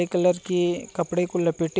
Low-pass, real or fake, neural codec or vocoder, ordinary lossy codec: none; real; none; none